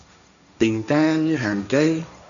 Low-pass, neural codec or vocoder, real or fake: 7.2 kHz; codec, 16 kHz, 1.1 kbps, Voila-Tokenizer; fake